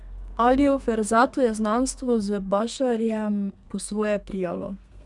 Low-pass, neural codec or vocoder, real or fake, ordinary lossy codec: 10.8 kHz; codec, 32 kHz, 1.9 kbps, SNAC; fake; none